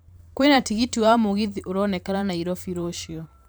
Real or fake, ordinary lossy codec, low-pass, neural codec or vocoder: fake; none; none; vocoder, 44.1 kHz, 128 mel bands every 512 samples, BigVGAN v2